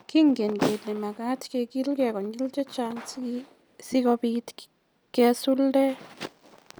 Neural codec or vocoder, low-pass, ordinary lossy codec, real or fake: none; none; none; real